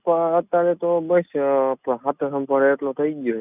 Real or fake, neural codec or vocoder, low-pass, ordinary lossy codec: real; none; 3.6 kHz; none